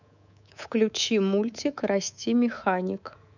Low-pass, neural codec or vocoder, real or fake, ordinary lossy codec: 7.2 kHz; codec, 24 kHz, 3.1 kbps, DualCodec; fake; none